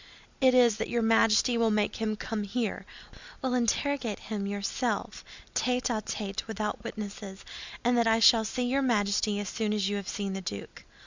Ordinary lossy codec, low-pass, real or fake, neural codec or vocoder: Opus, 64 kbps; 7.2 kHz; real; none